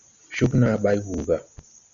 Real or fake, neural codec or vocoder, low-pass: real; none; 7.2 kHz